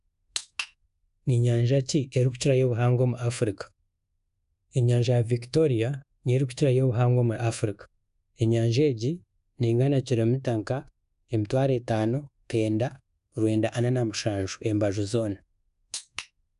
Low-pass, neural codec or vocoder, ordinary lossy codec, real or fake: 10.8 kHz; codec, 24 kHz, 1.2 kbps, DualCodec; none; fake